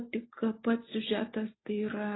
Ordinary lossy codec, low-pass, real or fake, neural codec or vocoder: AAC, 16 kbps; 7.2 kHz; real; none